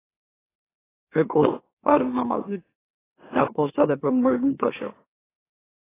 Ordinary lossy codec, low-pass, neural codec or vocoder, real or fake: AAC, 16 kbps; 3.6 kHz; autoencoder, 44.1 kHz, a latent of 192 numbers a frame, MeloTTS; fake